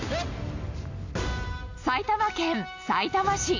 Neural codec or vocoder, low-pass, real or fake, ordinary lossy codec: none; 7.2 kHz; real; AAC, 48 kbps